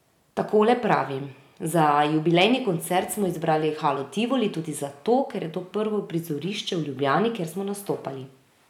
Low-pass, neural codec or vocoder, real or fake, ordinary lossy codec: 19.8 kHz; none; real; none